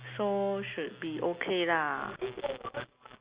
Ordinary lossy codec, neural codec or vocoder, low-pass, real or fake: Opus, 64 kbps; none; 3.6 kHz; real